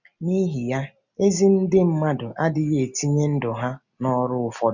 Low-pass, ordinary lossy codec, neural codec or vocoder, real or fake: 7.2 kHz; none; none; real